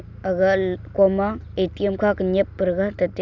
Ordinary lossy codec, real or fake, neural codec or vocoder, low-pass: none; real; none; 7.2 kHz